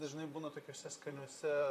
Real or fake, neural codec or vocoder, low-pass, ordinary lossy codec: fake; vocoder, 44.1 kHz, 128 mel bands, Pupu-Vocoder; 14.4 kHz; MP3, 96 kbps